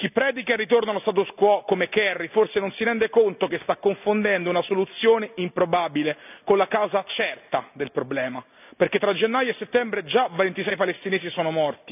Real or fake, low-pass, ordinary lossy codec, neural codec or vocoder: real; 3.6 kHz; none; none